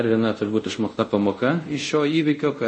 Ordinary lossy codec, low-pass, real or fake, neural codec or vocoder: MP3, 32 kbps; 9.9 kHz; fake; codec, 24 kHz, 0.5 kbps, DualCodec